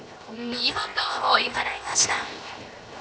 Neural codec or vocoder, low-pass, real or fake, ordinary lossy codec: codec, 16 kHz, 0.7 kbps, FocalCodec; none; fake; none